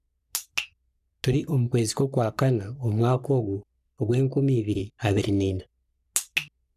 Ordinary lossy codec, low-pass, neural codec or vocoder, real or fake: none; 14.4 kHz; codec, 44.1 kHz, 3.4 kbps, Pupu-Codec; fake